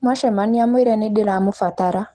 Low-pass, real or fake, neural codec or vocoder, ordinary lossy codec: 10.8 kHz; real; none; Opus, 16 kbps